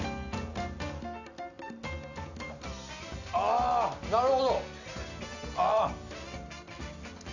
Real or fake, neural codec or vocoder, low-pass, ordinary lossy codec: real; none; 7.2 kHz; none